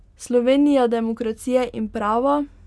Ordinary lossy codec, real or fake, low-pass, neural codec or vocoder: none; real; none; none